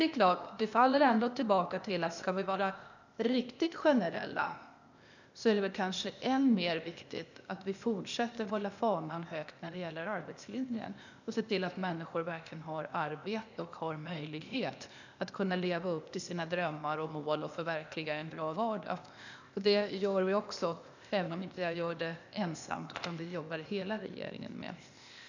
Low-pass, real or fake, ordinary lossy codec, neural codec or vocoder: 7.2 kHz; fake; none; codec, 16 kHz, 0.8 kbps, ZipCodec